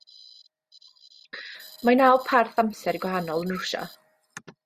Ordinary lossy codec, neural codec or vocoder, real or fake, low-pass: Opus, 64 kbps; none; real; 14.4 kHz